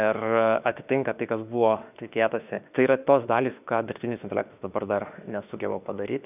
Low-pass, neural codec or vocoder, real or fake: 3.6 kHz; autoencoder, 48 kHz, 32 numbers a frame, DAC-VAE, trained on Japanese speech; fake